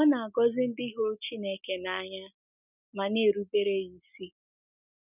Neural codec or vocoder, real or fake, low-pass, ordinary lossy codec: none; real; 3.6 kHz; none